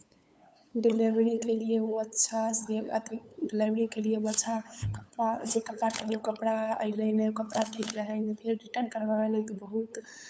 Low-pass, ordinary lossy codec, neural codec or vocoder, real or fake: none; none; codec, 16 kHz, 8 kbps, FunCodec, trained on LibriTTS, 25 frames a second; fake